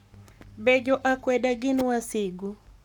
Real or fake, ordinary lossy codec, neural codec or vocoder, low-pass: fake; none; codec, 44.1 kHz, 7.8 kbps, Pupu-Codec; 19.8 kHz